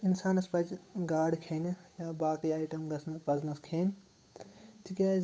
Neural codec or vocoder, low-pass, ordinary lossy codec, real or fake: codec, 16 kHz, 8 kbps, FunCodec, trained on Chinese and English, 25 frames a second; none; none; fake